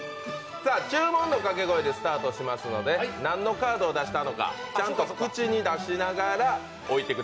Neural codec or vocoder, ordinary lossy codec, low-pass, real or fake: none; none; none; real